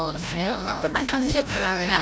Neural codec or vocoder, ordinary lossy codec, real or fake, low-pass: codec, 16 kHz, 0.5 kbps, FreqCodec, larger model; none; fake; none